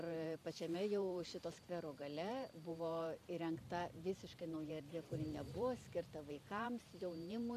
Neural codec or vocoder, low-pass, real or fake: vocoder, 44.1 kHz, 128 mel bands every 512 samples, BigVGAN v2; 14.4 kHz; fake